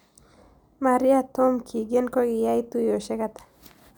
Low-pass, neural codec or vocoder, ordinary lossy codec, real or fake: none; none; none; real